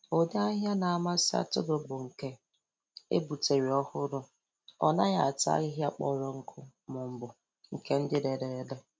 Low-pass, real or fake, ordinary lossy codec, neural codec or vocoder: none; real; none; none